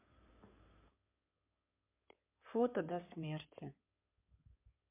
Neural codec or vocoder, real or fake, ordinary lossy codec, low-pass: codec, 16 kHz in and 24 kHz out, 2.2 kbps, FireRedTTS-2 codec; fake; MP3, 32 kbps; 3.6 kHz